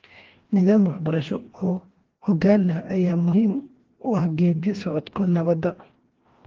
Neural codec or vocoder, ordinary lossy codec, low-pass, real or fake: codec, 16 kHz, 1 kbps, FreqCodec, larger model; Opus, 16 kbps; 7.2 kHz; fake